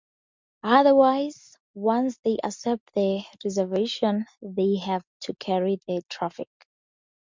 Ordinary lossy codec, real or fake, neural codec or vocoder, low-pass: MP3, 64 kbps; real; none; 7.2 kHz